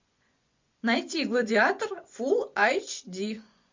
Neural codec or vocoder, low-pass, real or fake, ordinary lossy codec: vocoder, 24 kHz, 100 mel bands, Vocos; 7.2 kHz; fake; MP3, 64 kbps